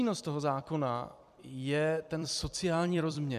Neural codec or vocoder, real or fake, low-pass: vocoder, 44.1 kHz, 128 mel bands every 256 samples, BigVGAN v2; fake; 14.4 kHz